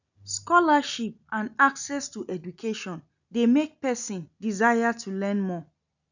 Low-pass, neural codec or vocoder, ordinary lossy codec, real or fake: 7.2 kHz; none; none; real